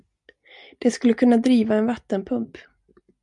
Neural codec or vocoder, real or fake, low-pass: none; real; 10.8 kHz